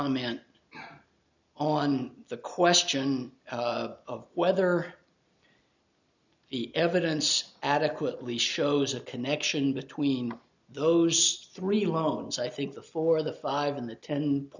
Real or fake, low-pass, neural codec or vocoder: real; 7.2 kHz; none